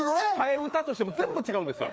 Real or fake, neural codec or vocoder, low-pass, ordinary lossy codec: fake; codec, 16 kHz, 4 kbps, FreqCodec, larger model; none; none